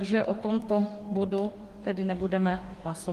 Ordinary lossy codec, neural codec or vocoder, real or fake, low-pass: Opus, 24 kbps; codec, 44.1 kHz, 2.6 kbps, DAC; fake; 14.4 kHz